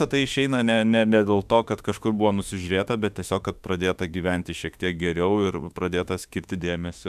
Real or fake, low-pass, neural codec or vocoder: fake; 14.4 kHz; autoencoder, 48 kHz, 32 numbers a frame, DAC-VAE, trained on Japanese speech